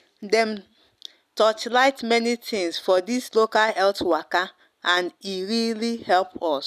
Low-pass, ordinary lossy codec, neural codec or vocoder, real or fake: 14.4 kHz; none; none; real